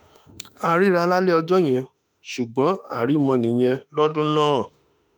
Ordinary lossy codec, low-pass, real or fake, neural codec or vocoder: none; none; fake; autoencoder, 48 kHz, 32 numbers a frame, DAC-VAE, trained on Japanese speech